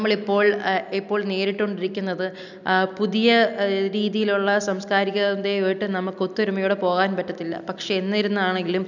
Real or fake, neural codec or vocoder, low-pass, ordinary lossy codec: real; none; 7.2 kHz; none